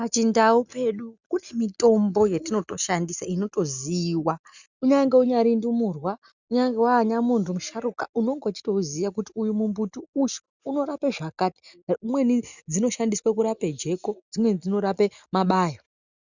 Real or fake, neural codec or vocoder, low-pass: real; none; 7.2 kHz